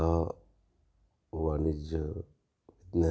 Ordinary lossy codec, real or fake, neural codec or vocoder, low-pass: none; real; none; none